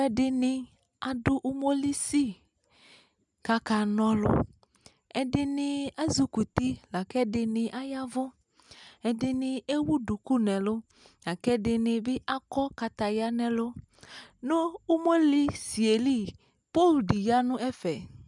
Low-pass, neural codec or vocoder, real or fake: 10.8 kHz; none; real